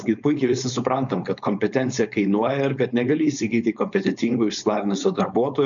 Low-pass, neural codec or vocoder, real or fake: 7.2 kHz; codec, 16 kHz, 4.8 kbps, FACodec; fake